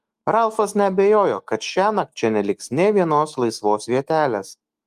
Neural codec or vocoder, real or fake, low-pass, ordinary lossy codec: autoencoder, 48 kHz, 128 numbers a frame, DAC-VAE, trained on Japanese speech; fake; 14.4 kHz; Opus, 32 kbps